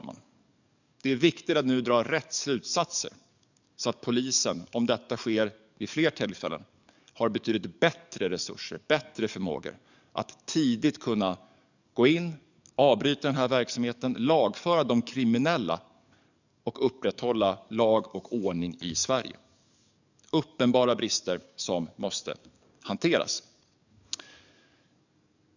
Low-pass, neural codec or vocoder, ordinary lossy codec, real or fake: 7.2 kHz; codec, 44.1 kHz, 7.8 kbps, DAC; none; fake